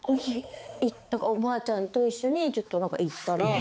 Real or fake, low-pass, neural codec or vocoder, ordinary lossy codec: fake; none; codec, 16 kHz, 4 kbps, X-Codec, HuBERT features, trained on balanced general audio; none